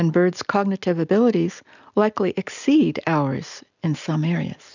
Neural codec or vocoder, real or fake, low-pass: none; real; 7.2 kHz